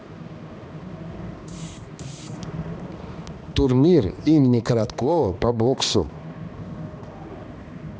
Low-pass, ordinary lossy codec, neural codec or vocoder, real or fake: none; none; codec, 16 kHz, 2 kbps, X-Codec, HuBERT features, trained on balanced general audio; fake